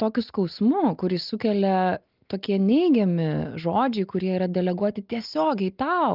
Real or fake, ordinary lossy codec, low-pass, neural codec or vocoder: real; Opus, 32 kbps; 5.4 kHz; none